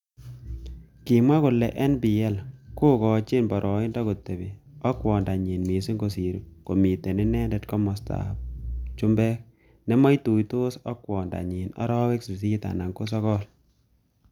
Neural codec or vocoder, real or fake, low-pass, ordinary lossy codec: none; real; 19.8 kHz; none